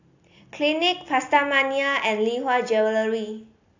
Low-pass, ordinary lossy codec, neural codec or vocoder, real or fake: 7.2 kHz; AAC, 48 kbps; none; real